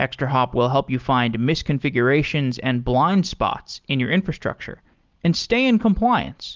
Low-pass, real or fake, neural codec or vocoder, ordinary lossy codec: 7.2 kHz; real; none; Opus, 32 kbps